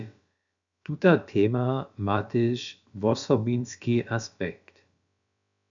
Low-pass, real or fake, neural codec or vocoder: 7.2 kHz; fake; codec, 16 kHz, about 1 kbps, DyCAST, with the encoder's durations